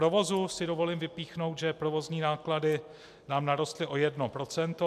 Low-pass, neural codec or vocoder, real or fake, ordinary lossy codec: 14.4 kHz; none; real; MP3, 96 kbps